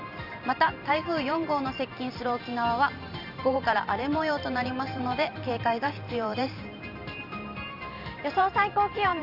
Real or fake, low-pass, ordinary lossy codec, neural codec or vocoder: fake; 5.4 kHz; none; vocoder, 44.1 kHz, 128 mel bands every 512 samples, BigVGAN v2